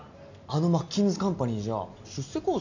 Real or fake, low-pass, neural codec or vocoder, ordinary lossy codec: real; 7.2 kHz; none; none